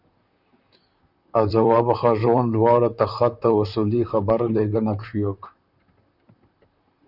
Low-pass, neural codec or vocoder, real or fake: 5.4 kHz; vocoder, 44.1 kHz, 128 mel bands, Pupu-Vocoder; fake